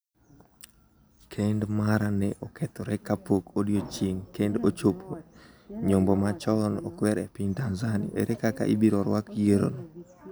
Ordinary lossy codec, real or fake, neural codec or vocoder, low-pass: none; real; none; none